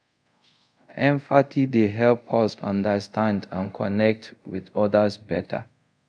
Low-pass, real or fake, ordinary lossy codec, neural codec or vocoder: 9.9 kHz; fake; none; codec, 24 kHz, 0.5 kbps, DualCodec